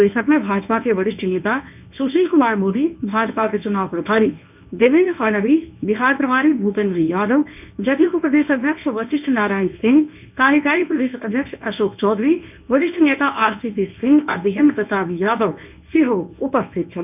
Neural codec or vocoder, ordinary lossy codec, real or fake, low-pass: codec, 24 kHz, 0.9 kbps, WavTokenizer, medium speech release version 1; none; fake; 3.6 kHz